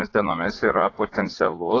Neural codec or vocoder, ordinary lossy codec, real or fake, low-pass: vocoder, 44.1 kHz, 80 mel bands, Vocos; AAC, 32 kbps; fake; 7.2 kHz